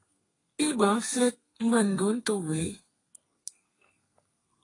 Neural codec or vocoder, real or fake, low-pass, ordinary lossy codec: codec, 32 kHz, 1.9 kbps, SNAC; fake; 10.8 kHz; AAC, 32 kbps